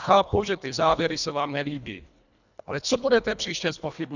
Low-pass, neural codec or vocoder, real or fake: 7.2 kHz; codec, 24 kHz, 1.5 kbps, HILCodec; fake